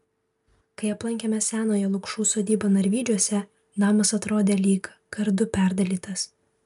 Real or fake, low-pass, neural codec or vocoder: real; 14.4 kHz; none